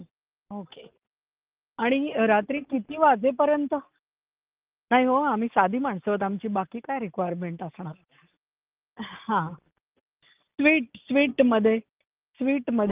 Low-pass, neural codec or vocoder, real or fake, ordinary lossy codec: 3.6 kHz; none; real; Opus, 24 kbps